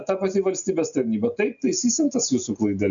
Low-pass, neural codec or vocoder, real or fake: 7.2 kHz; none; real